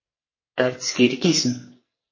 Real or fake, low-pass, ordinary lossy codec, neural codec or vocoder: fake; 7.2 kHz; MP3, 32 kbps; codec, 44.1 kHz, 2.6 kbps, SNAC